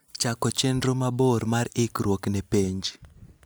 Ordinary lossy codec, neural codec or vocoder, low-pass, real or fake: none; none; none; real